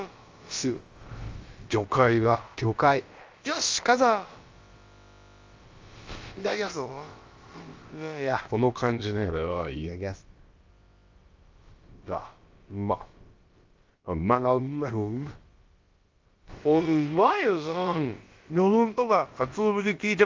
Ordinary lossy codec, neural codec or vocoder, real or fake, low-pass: Opus, 32 kbps; codec, 16 kHz, about 1 kbps, DyCAST, with the encoder's durations; fake; 7.2 kHz